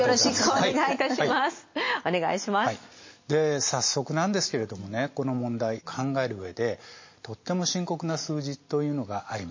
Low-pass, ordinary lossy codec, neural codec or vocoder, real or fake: 7.2 kHz; MP3, 32 kbps; none; real